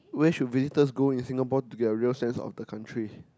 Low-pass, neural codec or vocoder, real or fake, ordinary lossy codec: none; none; real; none